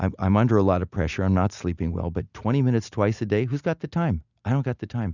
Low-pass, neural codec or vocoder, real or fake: 7.2 kHz; none; real